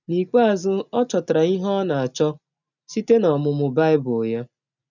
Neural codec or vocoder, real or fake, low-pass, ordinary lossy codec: none; real; 7.2 kHz; none